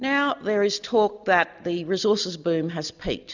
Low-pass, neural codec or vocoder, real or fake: 7.2 kHz; none; real